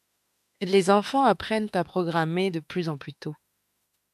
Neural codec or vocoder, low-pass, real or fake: autoencoder, 48 kHz, 32 numbers a frame, DAC-VAE, trained on Japanese speech; 14.4 kHz; fake